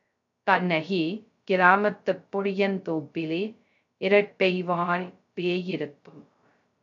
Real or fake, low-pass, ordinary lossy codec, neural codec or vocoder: fake; 7.2 kHz; AAC, 64 kbps; codec, 16 kHz, 0.2 kbps, FocalCodec